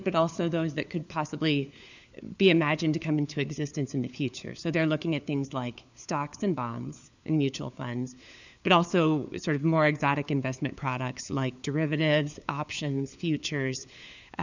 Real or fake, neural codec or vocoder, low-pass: fake; codec, 16 kHz, 4 kbps, FunCodec, trained on LibriTTS, 50 frames a second; 7.2 kHz